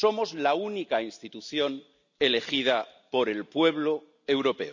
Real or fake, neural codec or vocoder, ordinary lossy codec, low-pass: real; none; none; 7.2 kHz